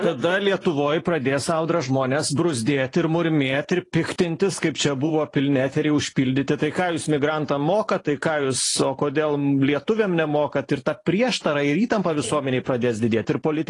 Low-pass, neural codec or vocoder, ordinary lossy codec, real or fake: 10.8 kHz; vocoder, 44.1 kHz, 128 mel bands every 512 samples, BigVGAN v2; AAC, 32 kbps; fake